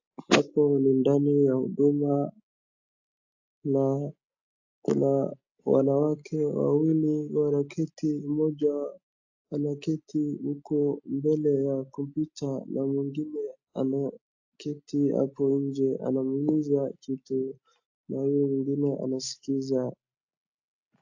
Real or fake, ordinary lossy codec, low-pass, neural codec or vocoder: real; AAC, 48 kbps; 7.2 kHz; none